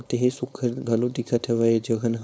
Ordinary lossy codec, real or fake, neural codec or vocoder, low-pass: none; fake; codec, 16 kHz, 4.8 kbps, FACodec; none